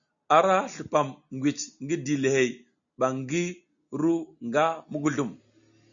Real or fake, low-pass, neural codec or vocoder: real; 7.2 kHz; none